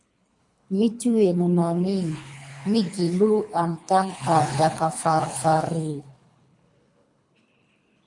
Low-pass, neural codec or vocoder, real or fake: 10.8 kHz; codec, 24 kHz, 3 kbps, HILCodec; fake